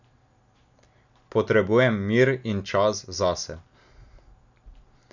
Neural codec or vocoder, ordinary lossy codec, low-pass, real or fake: none; none; 7.2 kHz; real